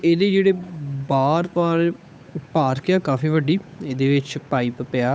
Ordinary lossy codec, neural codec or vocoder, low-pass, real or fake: none; codec, 16 kHz, 8 kbps, FunCodec, trained on Chinese and English, 25 frames a second; none; fake